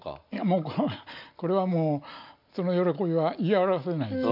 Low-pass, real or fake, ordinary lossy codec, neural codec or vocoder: 5.4 kHz; real; none; none